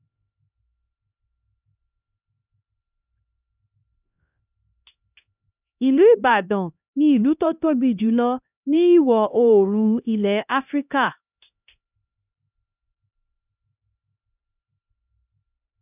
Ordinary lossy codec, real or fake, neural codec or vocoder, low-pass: none; fake; codec, 16 kHz, 1 kbps, X-Codec, HuBERT features, trained on LibriSpeech; 3.6 kHz